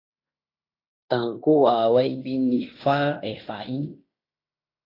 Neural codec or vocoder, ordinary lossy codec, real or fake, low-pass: codec, 16 kHz in and 24 kHz out, 0.9 kbps, LongCat-Audio-Codec, fine tuned four codebook decoder; AAC, 32 kbps; fake; 5.4 kHz